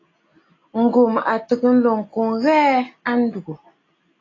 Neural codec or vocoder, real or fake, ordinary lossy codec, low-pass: none; real; AAC, 32 kbps; 7.2 kHz